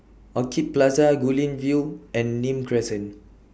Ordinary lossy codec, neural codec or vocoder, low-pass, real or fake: none; none; none; real